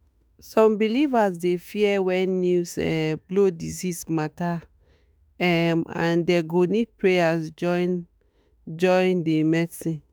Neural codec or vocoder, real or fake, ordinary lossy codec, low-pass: autoencoder, 48 kHz, 32 numbers a frame, DAC-VAE, trained on Japanese speech; fake; none; none